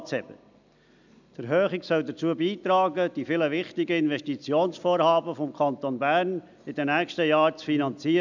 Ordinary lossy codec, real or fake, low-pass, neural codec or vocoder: none; real; 7.2 kHz; none